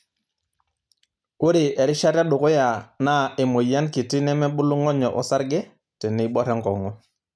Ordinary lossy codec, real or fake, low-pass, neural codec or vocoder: none; real; none; none